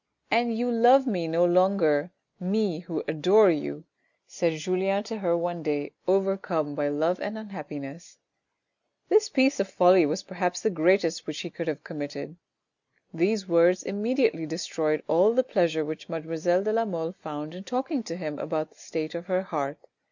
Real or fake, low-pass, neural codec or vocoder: real; 7.2 kHz; none